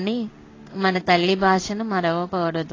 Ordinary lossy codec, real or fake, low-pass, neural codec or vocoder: AAC, 32 kbps; fake; 7.2 kHz; codec, 16 kHz in and 24 kHz out, 1 kbps, XY-Tokenizer